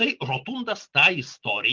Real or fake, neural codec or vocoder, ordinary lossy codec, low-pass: real; none; Opus, 24 kbps; 7.2 kHz